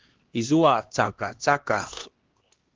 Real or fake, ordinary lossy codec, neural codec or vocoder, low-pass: fake; Opus, 16 kbps; codec, 16 kHz, 1 kbps, X-Codec, HuBERT features, trained on LibriSpeech; 7.2 kHz